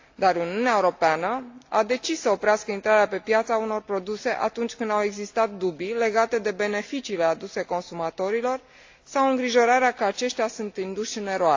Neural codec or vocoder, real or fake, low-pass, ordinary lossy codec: none; real; 7.2 kHz; AAC, 48 kbps